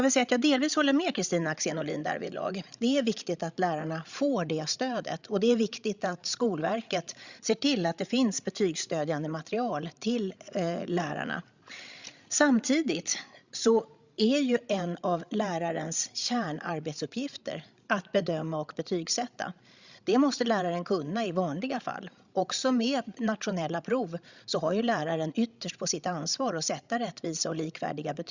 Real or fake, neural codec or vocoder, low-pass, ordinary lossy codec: fake; codec, 16 kHz, 16 kbps, FreqCodec, larger model; 7.2 kHz; Opus, 64 kbps